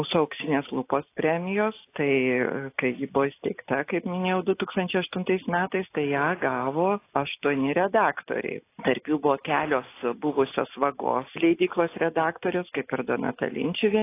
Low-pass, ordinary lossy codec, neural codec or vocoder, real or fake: 3.6 kHz; AAC, 24 kbps; none; real